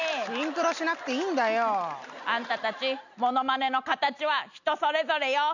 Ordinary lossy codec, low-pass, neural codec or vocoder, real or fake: none; 7.2 kHz; none; real